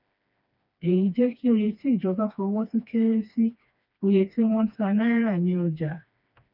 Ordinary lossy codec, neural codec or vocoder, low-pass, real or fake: none; codec, 16 kHz, 2 kbps, FreqCodec, smaller model; 5.4 kHz; fake